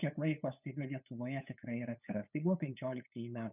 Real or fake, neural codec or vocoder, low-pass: fake; codec, 16 kHz, 8 kbps, FunCodec, trained on LibriTTS, 25 frames a second; 3.6 kHz